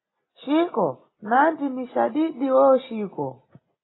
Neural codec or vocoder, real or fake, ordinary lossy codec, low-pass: none; real; AAC, 16 kbps; 7.2 kHz